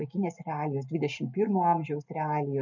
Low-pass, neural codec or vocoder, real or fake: 7.2 kHz; none; real